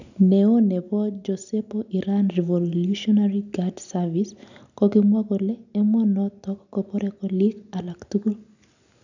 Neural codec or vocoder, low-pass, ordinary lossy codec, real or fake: none; 7.2 kHz; none; real